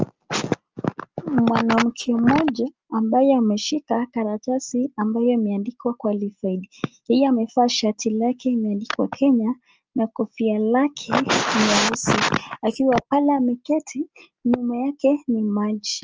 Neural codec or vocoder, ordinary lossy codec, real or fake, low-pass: none; Opus, 24 kbps; real; 7.2 kHz